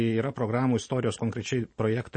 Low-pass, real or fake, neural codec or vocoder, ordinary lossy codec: 9.9 kHz; real; none; MP3, 32 kbps